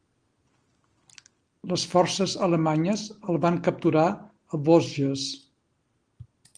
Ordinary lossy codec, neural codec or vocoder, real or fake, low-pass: Opus, 24 kbps; none; real; 9.9 kHz